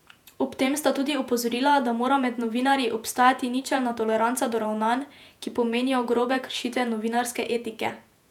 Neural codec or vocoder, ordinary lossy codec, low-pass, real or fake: vocoder, 48 kHz, 128 mel bands, Vocos; none; 19.8 kHz; fake